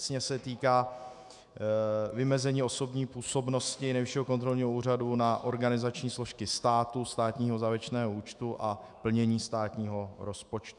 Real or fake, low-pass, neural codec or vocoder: fake; 10.8 kHz; autoencoder, 48 kHz, 128 numbers a frame, DAC-VAE, trained on Japanese speech